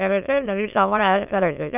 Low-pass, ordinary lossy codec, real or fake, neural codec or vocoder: 3.6 kHz; none; fake; autoencoder, 22.05 kHz, a latent of 192 numbers a frame, VITS, trained on many speakers